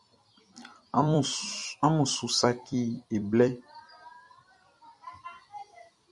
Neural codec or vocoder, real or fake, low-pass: vocoder, 44.1 kHz, 128 mel bands every 256 samples, BigVGAN v2; fake; 10.8 kHz